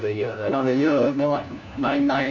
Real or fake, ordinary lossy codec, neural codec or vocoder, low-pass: fake; none; codec, 16 kHz, 1 kbps, FunCodec, trained on LibriTTS, 50 frames a second; 7.2 kHz